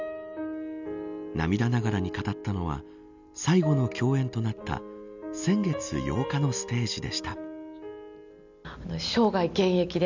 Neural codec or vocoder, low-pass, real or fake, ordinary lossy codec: none; 7.2 kHz; real; none